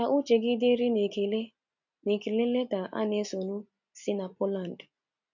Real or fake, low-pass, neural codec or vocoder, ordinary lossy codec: real; 7.2 kHz; none; none